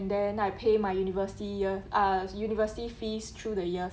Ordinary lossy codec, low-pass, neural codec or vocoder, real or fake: none; none; none; real